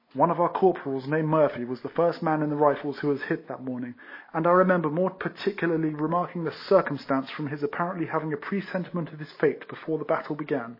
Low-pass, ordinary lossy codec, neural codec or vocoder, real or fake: 5.4 kHz; MP3, 24 kbps; none; real